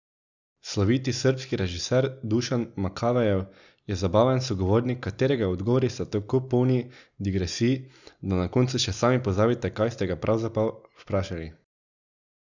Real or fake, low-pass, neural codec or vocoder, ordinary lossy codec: real; 7.2 kHz; none; none